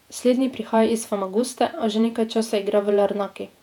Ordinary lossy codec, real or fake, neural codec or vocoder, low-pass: none; fake; vocoder, 48 kHz, 128 mel bands, Vocos; 19.8 kHz